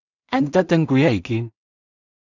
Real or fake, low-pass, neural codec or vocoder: fake; 7.2 kHz; codec, 16 kHz in and 24 kHz out, 0.4 kbps, LongCat-Audio-Codec, two codebook decoder